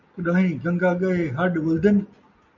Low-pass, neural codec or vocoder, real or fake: 7.2 kHz; none; real